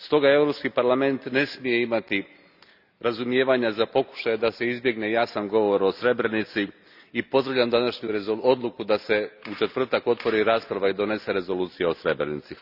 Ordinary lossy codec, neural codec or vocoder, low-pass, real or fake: none; none; 5.4 kHz; real